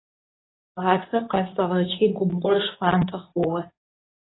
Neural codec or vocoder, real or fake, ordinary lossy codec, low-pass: codec, 24 kHz, 0.9 kbps, WavTokenizer, medium speech release version 2; fake; AAC, 16 kbps; 7.2 kHz